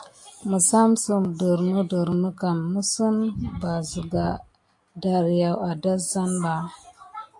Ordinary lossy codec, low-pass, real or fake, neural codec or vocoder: AAC, 64 kbps; 10.8 kHz; real; none